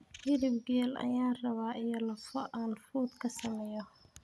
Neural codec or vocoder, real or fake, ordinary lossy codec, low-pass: none; real; none; none